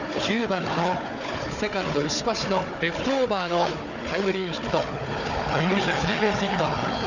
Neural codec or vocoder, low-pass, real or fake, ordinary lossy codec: codec, 16 kHz, 4 kbps, FunCodec, trained on Chinese and English, 50 frames a second; 7.2 kHz; fake; none